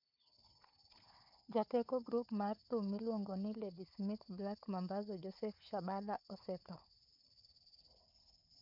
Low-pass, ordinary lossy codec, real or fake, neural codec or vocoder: 5.4 kHz; MP3, 48 kbps; fake; codec, 16 kHz, 4 kbps, FunCodec, trained on Chinese and English, 50 frames a second